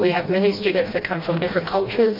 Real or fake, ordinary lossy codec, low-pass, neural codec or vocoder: fake; MP3, 32 kbps; 5.4 kHz; codec, 16 kHz, 1 kbps, FreqCodec, smaller model